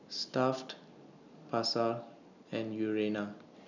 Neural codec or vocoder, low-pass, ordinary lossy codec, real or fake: none; 7.2 kHz; none; real